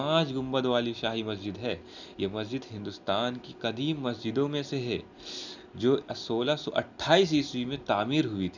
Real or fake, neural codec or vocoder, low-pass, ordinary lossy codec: real; none; 7.2 kHz; none